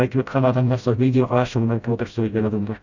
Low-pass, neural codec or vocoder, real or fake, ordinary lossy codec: 7.2 kHz; codec, 16 kHz, 0.5 kbps, FreqCodec, smaller model; fake; none